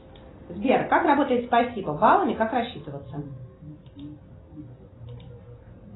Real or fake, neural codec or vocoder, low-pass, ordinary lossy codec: real; none; 7.2 kHz; AAC, 16 kbps